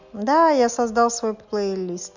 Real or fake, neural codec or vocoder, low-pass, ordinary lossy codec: real; none; 7.2 kHz; none